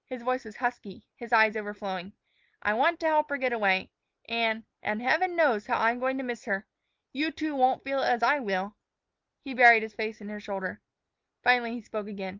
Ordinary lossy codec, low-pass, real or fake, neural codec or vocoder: Opus, 24 kbps; 7.2 kHz; real; none